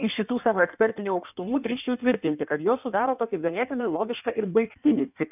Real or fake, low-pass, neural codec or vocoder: fake; 3.6 kHz; codec, 16 kHz in and 24 kHz out, 1.1 kbps, FireRedTTS-2 codec